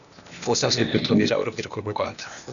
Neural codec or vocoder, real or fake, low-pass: codec, 16 kHz, 0.8 kbps, ZipCodec; fake; 7.2 kHz